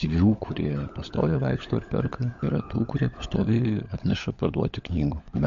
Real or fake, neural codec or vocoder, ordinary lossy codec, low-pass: fake; codec, 16 kHz, 16 kbps, FunCodec, trained on LibriTTS, 50 frames a second; MP3, 48 kbps; 7.2 kHz